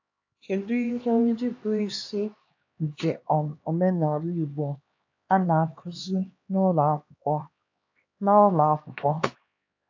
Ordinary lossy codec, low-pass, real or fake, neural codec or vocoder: AAC, 48 kbps; 7.2 kHz; fake; codec, 16 kHz, 2 kbps, X-Codec, HuBERT features, trained on LibriSpeech